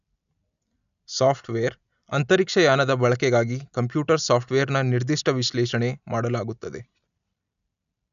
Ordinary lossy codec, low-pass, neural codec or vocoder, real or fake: none; 7.2 kHz; none; real